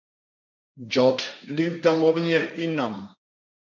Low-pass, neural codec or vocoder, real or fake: 7.2 kHz; codec, 16 kHz, 1.1 kbps, Voila-Tokenizer; fake